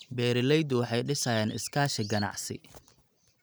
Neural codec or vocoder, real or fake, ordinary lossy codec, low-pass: none; real; none; none